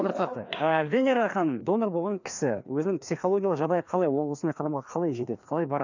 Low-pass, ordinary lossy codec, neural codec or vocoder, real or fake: 7.2 kHz; none; codec, 16 kHz, 2 kbps, FreqCodec, larger model; fake